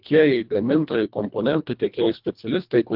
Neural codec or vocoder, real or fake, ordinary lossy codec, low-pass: codec, 24 kHz, 1.5 kbps, HILCodec; fake; Opus, 32 kbps; 5.4 kHz